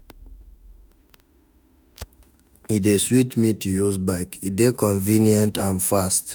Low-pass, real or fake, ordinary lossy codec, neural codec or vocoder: none; fake; none; autoencoder, 48 kHz, 32 numbers a frame, DAC-VAE, trained on Japanese speech